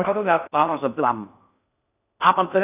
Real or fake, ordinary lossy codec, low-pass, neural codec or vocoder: fake; none; 3.6 kHz; codec, 16 kHz in and 24 kHz out, 0.6 kbps, FocalCodec, streaming, 4096 codes